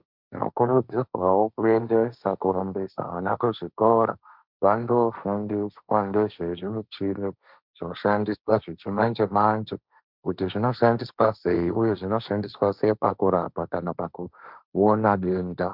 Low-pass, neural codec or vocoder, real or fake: 5.4 kHz; codec, 16 kHz, 1.1 kbps, Voila-Tokenizer; fake